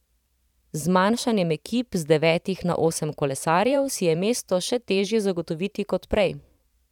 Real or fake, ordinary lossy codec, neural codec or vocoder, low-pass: fake; none; vocoder, 44.1 kHz, 128 mel bands every 512 samples, BigVGAN v2; 19.8 kHz